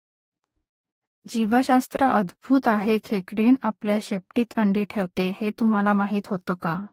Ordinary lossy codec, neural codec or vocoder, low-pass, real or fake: AAC, 48 kbps; codec, 44.1 kHz, 2.6 kbps, DAC; 19.8 kHz; fake